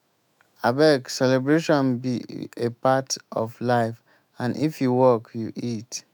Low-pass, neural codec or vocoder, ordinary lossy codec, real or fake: none; autoencoder, 48 kHz, 128 numbers a frame, DAC-VAE, trained on Japanese speech; none; fake